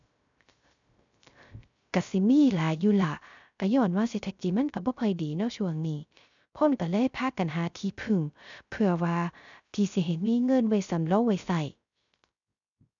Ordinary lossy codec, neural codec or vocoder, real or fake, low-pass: none; codec, 16 kHz, 0.3 kbps, FocalCodec; fake; 7.2 kHz